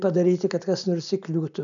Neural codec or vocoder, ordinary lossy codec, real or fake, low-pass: none; MP3, 96 kbps; real; 7.2 kHz